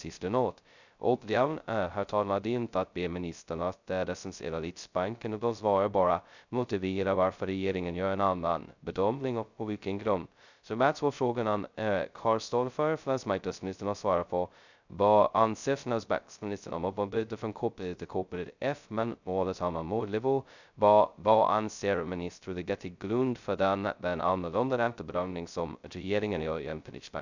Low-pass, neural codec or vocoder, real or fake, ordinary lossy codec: 7.2 kHz; codec, 16 kHz, 0.2 kbps, FocalCodec; fake; none